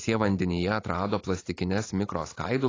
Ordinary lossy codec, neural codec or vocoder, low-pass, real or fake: AAC, 32 kbps; vocoder, 44.1 kHz, 128 mel bands every 512 samples, BigVGAN v2; 7.2 kHz; fake